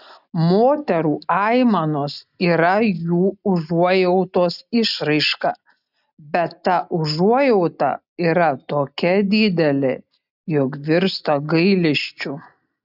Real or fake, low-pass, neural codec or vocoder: real; 5.4 kHz; none